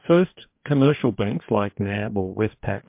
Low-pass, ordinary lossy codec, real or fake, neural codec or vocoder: 3.6 kHz; MP3, 32 kbps; fake; codec, 44.1 kHz, 2.6 kbps, DAC